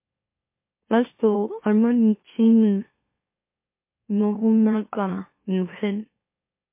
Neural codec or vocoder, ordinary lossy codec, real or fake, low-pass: autoencoder, 44.1 kHz, a latent of 192 numbers a frame, MeloTTS; MP3, 24 kbps; fake; 3.6 kHz